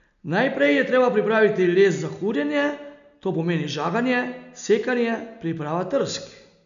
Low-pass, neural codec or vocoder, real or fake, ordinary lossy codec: 7.2 kHz; none; real; none